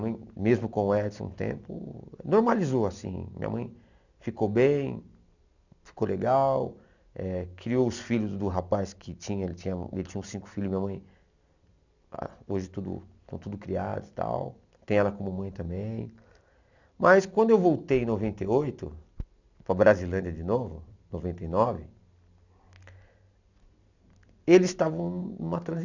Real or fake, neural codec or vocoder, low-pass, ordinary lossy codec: real; none; 7.2 kHz; none